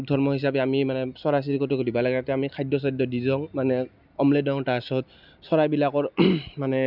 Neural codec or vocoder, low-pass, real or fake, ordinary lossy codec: none; 5.4 kHz; real; none